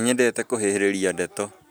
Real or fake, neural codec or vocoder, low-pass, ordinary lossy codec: real; none; none; none